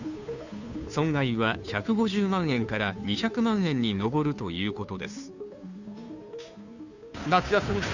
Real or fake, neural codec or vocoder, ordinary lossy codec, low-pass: fake; codec, 16 kHz, 2 kbps, FunCodec, trained on Chinese and English, 25 frames a second; none; 7.2 kHz